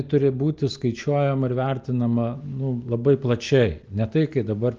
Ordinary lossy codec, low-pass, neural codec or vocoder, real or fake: Opus, 32 kbps; 7.2 kHz; none; real